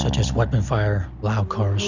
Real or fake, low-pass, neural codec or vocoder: real; 7.2 kHz; none